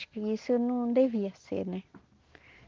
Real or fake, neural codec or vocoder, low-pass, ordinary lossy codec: real; none; 7.2 kHz; Opus, 16 kbps